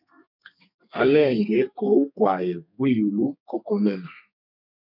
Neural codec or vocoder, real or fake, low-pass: codec, 32 kHz, 1.9 kbps, SNAC; fake; 5.4 kHz